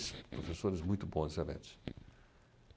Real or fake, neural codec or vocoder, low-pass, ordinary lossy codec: real; none; none; none